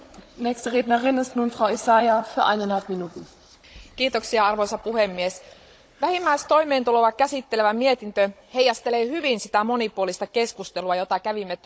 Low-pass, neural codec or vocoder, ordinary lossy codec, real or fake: none; codec, 16 kHz, 16 kbps, FunCodec, trained on Chinese and English, 50 frames a second; none; fake